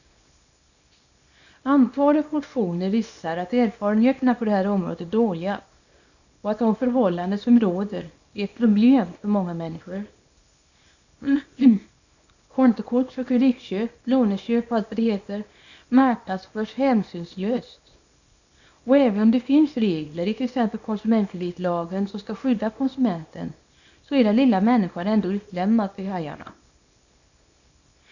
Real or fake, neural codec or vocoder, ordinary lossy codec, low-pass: fake; codec, 24 kHz, 0.9 kbps, WavTokenizer, small release; none; 7.2 kHz